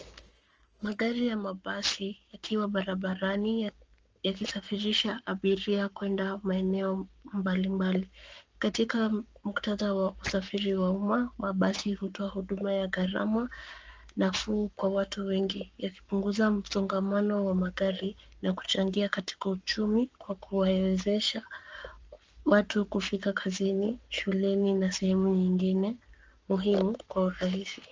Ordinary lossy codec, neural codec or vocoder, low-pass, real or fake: Opus, 24 kbps; codec, 44.1 kHz, 7.8 kbps, Pupu-Codec; 7.2 kHz; fake